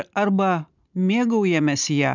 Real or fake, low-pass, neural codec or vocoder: real; 7.2 kHz; none